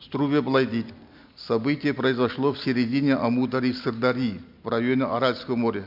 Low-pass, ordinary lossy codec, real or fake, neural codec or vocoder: 5.4 kHz; MP3, 48 kbps; real; none